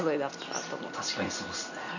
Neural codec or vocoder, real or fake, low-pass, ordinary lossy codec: none; real; 7.2 kHz; none